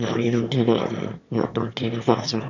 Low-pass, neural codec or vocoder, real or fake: 7.2 kHz; autoencoder, 22.05 kHz, a latent of 192 numbers a frame, VITS, trained on one speaker; fake